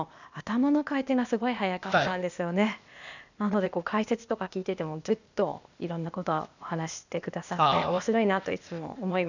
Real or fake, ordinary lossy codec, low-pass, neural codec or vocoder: fake; none; 7.2 kHz; codec, 16 kHz, 0.8 kbps, ZipCodec